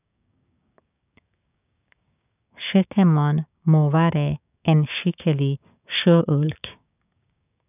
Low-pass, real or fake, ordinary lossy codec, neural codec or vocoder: 3.6 kHz; fake; none; codec, 16 kHz, 6 kbps, DAC